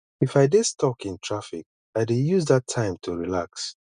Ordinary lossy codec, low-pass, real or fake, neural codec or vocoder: none; 9.9 kHz; real; none